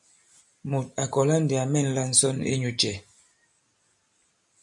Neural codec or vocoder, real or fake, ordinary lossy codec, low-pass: none; real; MP3, 96 kbps; 10.8 kHz